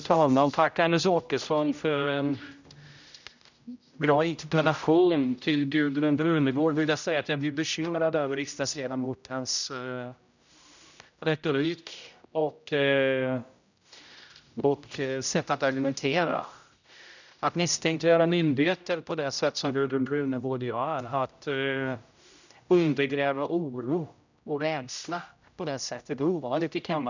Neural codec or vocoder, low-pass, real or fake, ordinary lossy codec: codec, 16 kHz, 0.5 kbps, X-Codec, HuBERT features, trained on general audio; 7.2 kHz; fake; none